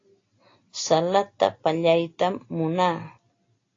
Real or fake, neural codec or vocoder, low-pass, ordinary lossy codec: real; none; 7.2 kHz; AAC, 32 kbps